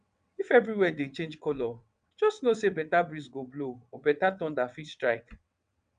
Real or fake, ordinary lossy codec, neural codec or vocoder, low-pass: fake; MP3, 96 kbps; vocoder, 22.05 kHz, 80 mel bands, WaveNeXt; 9.9 kHz